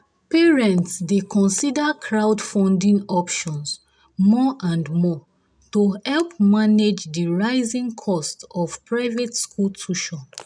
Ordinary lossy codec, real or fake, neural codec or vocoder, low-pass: none; real; none; 9.9 kHz